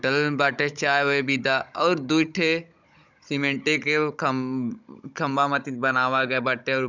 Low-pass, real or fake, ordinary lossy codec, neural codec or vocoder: 7.2 kHz; fake; none; codec, 16 kHz, 16 kbps, FunCodec, trained on Chinese and English, 50 frames a second